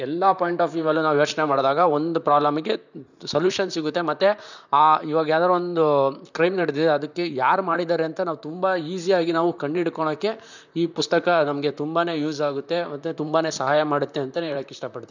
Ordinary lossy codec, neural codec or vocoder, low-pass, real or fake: none; vocoder, 44.1 kHz, 128 mel bands, Pupu-Vocoder; 7.2 kHz; fake